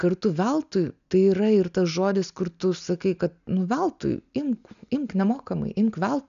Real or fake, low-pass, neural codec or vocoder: real; 7.2 kHz; none